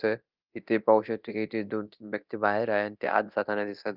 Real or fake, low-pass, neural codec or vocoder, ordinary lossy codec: fake; 5.4 kHz; codec, 24 kHz, 0.9 kbps, DualCodec; Opus, 32 kbps